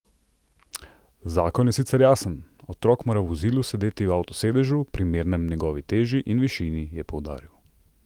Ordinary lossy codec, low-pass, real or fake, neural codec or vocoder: Opus, 24 kbps; 19.8 kHz; fake; autoencoder, 48 kHz, 128 numbers a frame, DAC-VAE, trained on Japanese speech